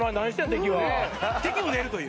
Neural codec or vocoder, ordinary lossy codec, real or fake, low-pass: none; none; real; none